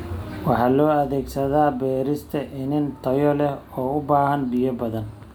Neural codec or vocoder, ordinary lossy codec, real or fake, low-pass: none; none; real; none